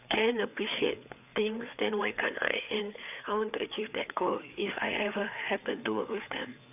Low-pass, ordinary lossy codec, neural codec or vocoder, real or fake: 3.6 kHz; none; codec, 16 kHz, 4 kbps, FreqCodec, larger model; fake